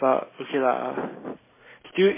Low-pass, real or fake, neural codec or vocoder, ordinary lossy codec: 3.6 kHz; real; none; MP3, 16 kbps